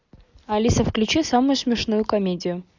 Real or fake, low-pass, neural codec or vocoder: real; 7.2 kHz; none